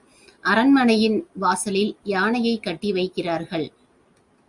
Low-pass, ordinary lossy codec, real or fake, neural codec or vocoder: 10.8 kHz; Opus, 64 kbps; real; none